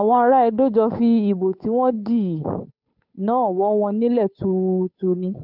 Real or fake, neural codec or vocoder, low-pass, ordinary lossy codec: fake; codec, 16 kHz, 8 kbps, FunCodec, trained on Chinese and English, 25 frames a second; 5.4 kHz; none